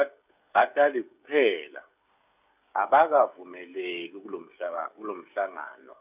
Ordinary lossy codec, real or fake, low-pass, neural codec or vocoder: none; fake; 3.6 kHz; codec, 16 kHz, 16 kbps, FreqCodec, smaller model